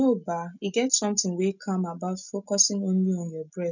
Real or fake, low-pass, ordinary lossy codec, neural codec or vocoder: real; 7.2 kHz; none; none